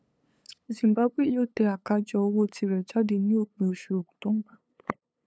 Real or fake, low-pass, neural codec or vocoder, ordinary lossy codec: fake; none; codec, 16 kHz, 8 kbps, FunCodec, trained on LibriTTS, 25 frames a second; none